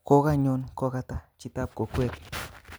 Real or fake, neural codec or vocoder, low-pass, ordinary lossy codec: real; none; none; none